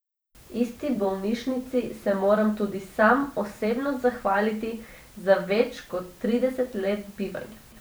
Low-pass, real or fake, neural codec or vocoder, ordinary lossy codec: none; fake; vocoder, 44.1 kHz, 128 mel bands every 512 samples, BigVGAN v2; none